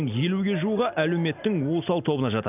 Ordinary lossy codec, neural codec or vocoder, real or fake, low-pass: none; none; real; 3.6 kHz